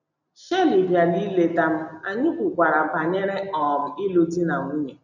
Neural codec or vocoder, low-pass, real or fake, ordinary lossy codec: none; 7.2 kHz; real; none